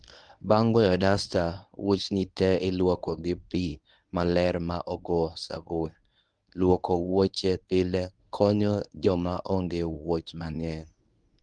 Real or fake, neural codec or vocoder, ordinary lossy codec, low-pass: fake; codec, 24 kHz, 0.9 kbps, WavTokenizer, medium speech release version 1; Opus, 32 kbps; 9.9 kHz